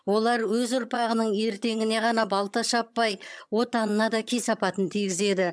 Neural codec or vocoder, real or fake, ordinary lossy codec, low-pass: vocoder, 22.05 kHz, 80 mel bands, HiFi-GAN; fake; none; none